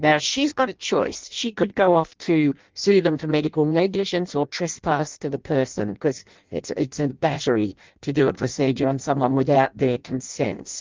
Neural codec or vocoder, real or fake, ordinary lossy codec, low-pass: codec, 16 kHz in and 24 kHz out, 0.6 kbps, FireRedTTS-2 codec; fake; Opus, 32 kbps; 7.2 kHz